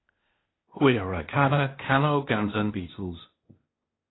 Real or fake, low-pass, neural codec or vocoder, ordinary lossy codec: fake; 7.2 kHz; codec, 16 kHz, 0.8 kbps, ZipCodec; AAC, 16 kbps